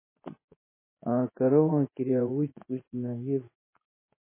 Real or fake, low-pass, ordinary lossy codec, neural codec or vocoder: fake; 3.6 kHz; MP3, 16 kbps; vocoder, 24 kHz, 100 mel bands, Vocos